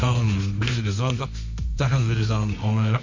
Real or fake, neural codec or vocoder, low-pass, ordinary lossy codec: fake; codec, 24 kHz, 0.9 kbps, WavTokenizer, medium music audio release; 7.2 kHz; MP3, 48 kbps